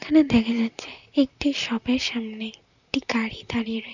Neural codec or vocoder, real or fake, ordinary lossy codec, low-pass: vocoder, 44.1 kHz, 128 mel bands, Pupu-Vocoder; fake; none; 7.2 kHz